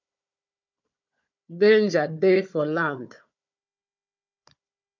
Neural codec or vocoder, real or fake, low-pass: codec, 16 kHz, 4 kbps, FunCodec, trained on Chinese and English, 50 frames a second; fake; 7.2 kHz